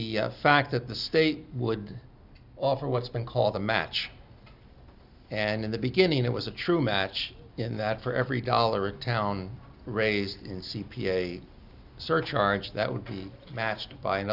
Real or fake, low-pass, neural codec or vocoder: real; 5.4 kHz; none